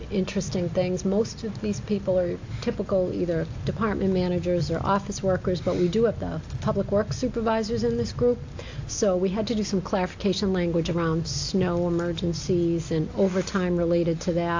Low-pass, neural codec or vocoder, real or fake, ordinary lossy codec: 7.2 kHz; none; real; AAC, 48 kbps